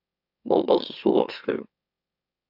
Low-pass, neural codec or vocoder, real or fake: 5.4 kHz; autoencoder, 44.1 kHz, a latent of 192 numbers a frame, MeloTTS; fake